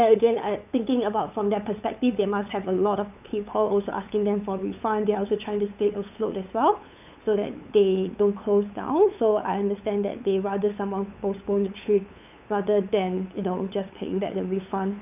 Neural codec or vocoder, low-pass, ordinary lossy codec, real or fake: codec, 16 kHz, 8 kbps, FunCodec, trained on LibriTTS, 25 frames a second; 3.6 kHz; none; fake